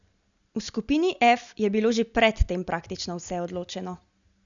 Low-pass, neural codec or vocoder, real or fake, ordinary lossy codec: 7.2 kHz; none; real; none